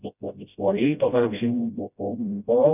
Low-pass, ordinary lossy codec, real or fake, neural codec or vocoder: 3.6 kHz; none; fake; codec, 16 kHz, 0.5 kbps, FreqCodec, smaller model